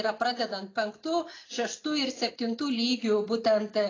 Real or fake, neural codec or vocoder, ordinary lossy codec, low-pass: real; none; AAC, 32 kbps; 7.2 kHz